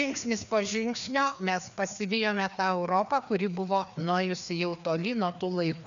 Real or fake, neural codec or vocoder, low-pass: fake; codec, 16 kHz, 2 kbps, FreqCodec, larger model; 7.2 kHz